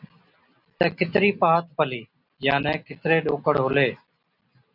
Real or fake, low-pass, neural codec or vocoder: real; 5.4 kHz; none